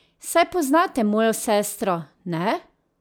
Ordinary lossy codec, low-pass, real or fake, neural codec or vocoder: none; none; real; none